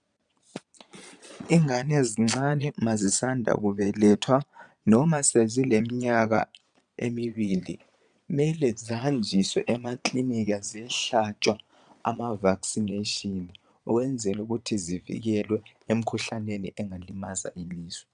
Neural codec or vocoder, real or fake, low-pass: vocoder, 22.05 kHz, 80 mel bands, Vocos; fake; 9.9 kHz